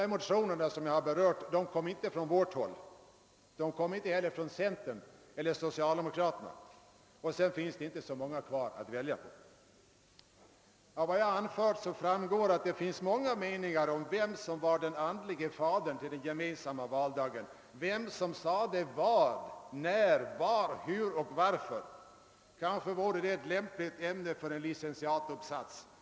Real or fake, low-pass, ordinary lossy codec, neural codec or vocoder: real; none; none; none